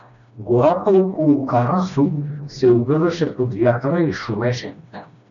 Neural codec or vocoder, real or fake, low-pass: codec, 16 kHz, 1 kbps, FreqCodec, smaller model; fake; 7.2 kHz